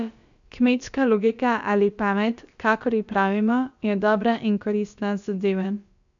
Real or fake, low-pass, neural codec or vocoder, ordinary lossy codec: fake; 7.2 kHz; codec, 16 kHz, about 1 kbps, DyCAST, with the encoder's durations; none